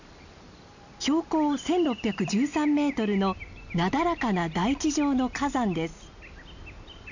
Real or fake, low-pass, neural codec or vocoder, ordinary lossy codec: real; 7.2 kHz; none; none